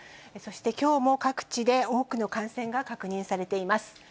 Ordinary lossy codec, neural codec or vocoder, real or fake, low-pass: none; none; real; none